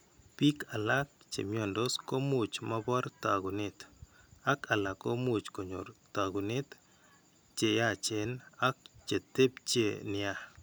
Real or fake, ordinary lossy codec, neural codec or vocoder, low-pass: real; none; none; none